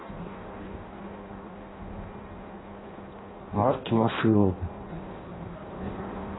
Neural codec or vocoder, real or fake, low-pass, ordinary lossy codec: codec, 16 kHz in and 24 kHz out, 0.6 kbps, FireRedTTS-2 codec; fake; 7.2 kHz; AAC, 16 kbps